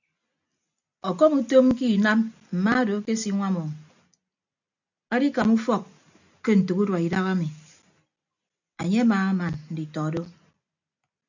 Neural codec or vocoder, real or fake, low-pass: none; real; 7.2 kHz